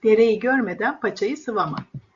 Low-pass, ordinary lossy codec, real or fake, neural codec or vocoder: 7.2 kHz; Opus, 64 kbps; real; none